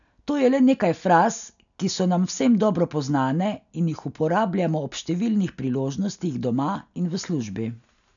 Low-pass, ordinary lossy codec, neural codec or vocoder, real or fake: 7.2 kHz; none; none; real